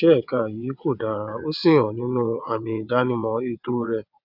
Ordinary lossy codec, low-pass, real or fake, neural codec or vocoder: none; 5.4 kHz; fake; vocoder, 22.05 kHz, 80 mel bands, WaveNeXt